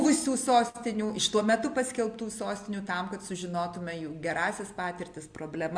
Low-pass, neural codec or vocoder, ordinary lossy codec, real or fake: 9.9 kHz; none; MP3, 64 kbps; real